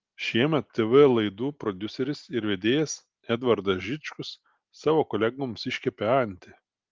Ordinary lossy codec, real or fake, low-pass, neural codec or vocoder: Opus, 32 kbps; real; 7.2 kHz; none